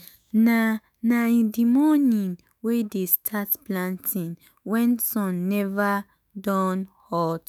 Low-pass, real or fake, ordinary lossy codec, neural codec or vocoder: none; fake; none; autoencoder, 48 kHz, 128 numbers a frame, DAC-VAE, trained on Japanese speech